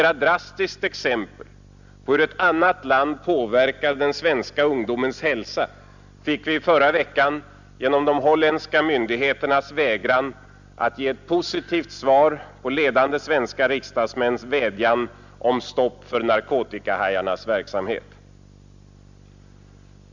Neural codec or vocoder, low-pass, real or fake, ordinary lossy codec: none; 7.2 kHz; real; Opus, 64 kbps